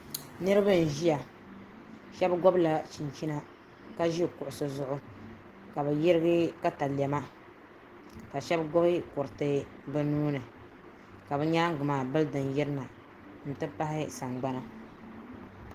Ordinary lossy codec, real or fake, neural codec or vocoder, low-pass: Opus, 16 kbps; real; none; 14.4 kHz